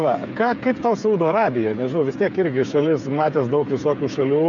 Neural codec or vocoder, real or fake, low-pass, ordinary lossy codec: codec, 16 kHz, 8 kbps, FreqCodec, smaller model; fake; 7.2 kHz; MP3, 64 kbps